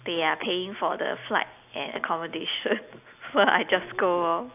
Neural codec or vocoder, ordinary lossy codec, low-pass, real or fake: none; AAC, 32 kbps; 3.6 kHz; real